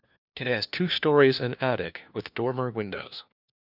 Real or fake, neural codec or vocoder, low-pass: fake; codec, 16 kHz, 1 kbps, FunCodec, trained on LibriTTS, 50 frames a second; 5.4 kHz